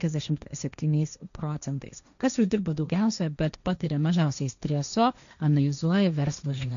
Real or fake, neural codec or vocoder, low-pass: fake; codec, 16 kHz, 1.1 kbps, Voila-Tokenizer; 7.2 kHz